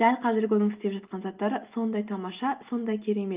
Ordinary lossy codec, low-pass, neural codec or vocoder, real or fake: Opus, 32 kbps; 3.6 kHz; none; real